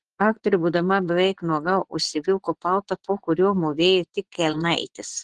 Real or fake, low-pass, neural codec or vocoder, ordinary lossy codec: fake; 10.8 kHz; vocoder, 24 kHz, 100 mel bands, Vocos; Opus, 16 kbps